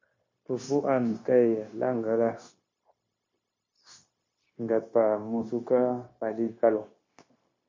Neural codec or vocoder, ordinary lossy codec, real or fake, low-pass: codec, 16 kHz, 0.9 kbps, LongCat-Audio-Codec; MP3, 32 kbps; fake; 7.2 kHz